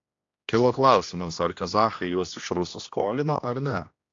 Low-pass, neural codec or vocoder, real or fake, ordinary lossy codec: 7.2 kHz; codec, 16 kHz, 1 kbps, X-Codec, HuBERT features, trained on general audio; fake; AAC, 48 kbps